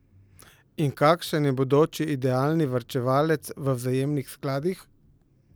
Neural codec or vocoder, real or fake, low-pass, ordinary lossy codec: none; real; none; none